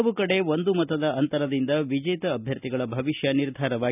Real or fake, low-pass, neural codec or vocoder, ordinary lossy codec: real; 3.6 kHz; none; none